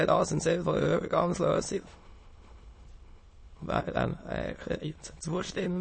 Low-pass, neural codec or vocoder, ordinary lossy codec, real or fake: 9.9 kHz; autoencoder, 22.05 kHz, a latent of 192 numbers a frame, VITS, trained on many speakers; MP3, 32 kbps; fake